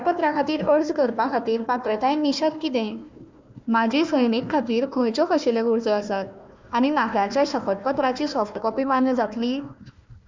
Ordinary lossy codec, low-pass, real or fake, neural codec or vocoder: MP3, 64 kbps; 7.2 kHz; fake; codec, 16 kHz, 1 kbps, FunCodec, trained on Chinese and English, 50 frames a second